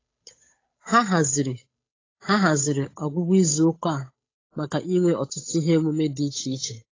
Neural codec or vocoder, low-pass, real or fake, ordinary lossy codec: codec, 16 kHz, 8 kbps, FunCodec, trained on Chinese and English, 25 frames a second; 7.2 kHz; fake; AAC, 32 kbps